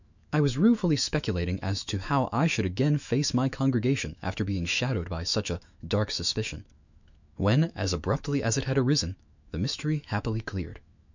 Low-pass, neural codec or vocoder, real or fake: 7.2 kHz; autoencoder, 48 kHz, 128 numbers a frame, DAC-VAE, trained on Japanese speech; fake